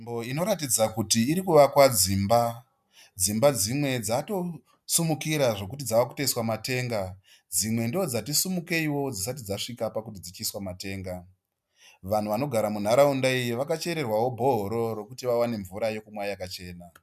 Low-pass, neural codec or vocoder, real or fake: 14.4 kHz; none; real